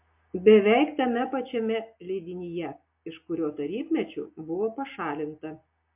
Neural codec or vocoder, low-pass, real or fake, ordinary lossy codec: none; 3.6 kHz; real; MP3, 32 kbps